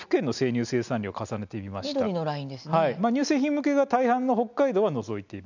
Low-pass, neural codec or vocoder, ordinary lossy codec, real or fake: 7.2 kHz; none; none; real